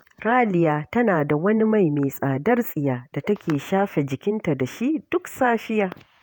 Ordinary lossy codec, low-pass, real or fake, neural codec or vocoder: none; none; fake; vocoder, 48 kHz, 128 mel bands, Vocos